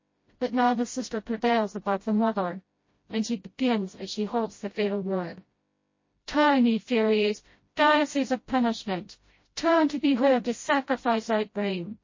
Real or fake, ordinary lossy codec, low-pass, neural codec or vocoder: fake; MP3, 32 kbps; 7.2 kHz; codec, 16 kHz, 0.5 kbps, FreqCodec, smaller model